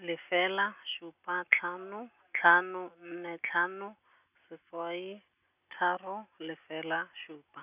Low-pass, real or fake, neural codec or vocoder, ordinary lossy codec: 3.6 kHz; real; none; none